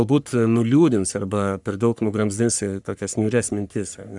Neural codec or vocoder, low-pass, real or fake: codec, 44.1 kHz, 3.4 kbps, Pupu-Codec; 10.8 kHz; fake